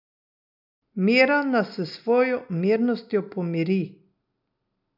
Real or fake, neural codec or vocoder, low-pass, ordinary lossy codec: real; none; 5.4 kHz; none